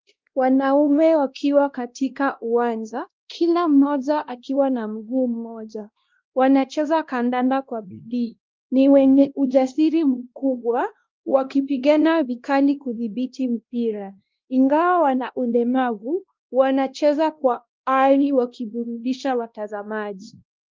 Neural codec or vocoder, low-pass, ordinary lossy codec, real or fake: codec, 16 kHz, 1 kbps, X-Codec, WavLM features, trained on Multilingual LibriSpeech; 7.2 kHz; Opus, 24 kbps; fake